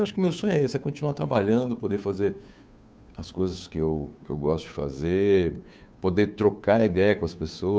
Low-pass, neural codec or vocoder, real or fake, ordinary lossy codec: none; codec, 16 kHz, 2 kbps, FunCodec, trained on Chinese and English, 25 frames a second; fake; none